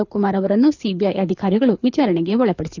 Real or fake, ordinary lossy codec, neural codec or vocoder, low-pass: fake; none; codec, 24 kHz, 6 kbps, HILCodec; 7.2 kHz